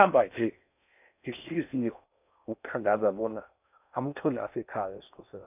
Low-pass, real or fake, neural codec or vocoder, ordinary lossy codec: 3.6 kHz; fake; codec, 16 kHz in and 24 kHz out, 0.6 kbps, FocalCodec, streaming, 4096 codes; none